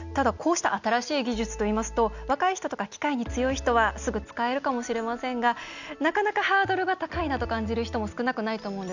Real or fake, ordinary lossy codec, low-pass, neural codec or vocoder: real; none; 7.2 kHz; none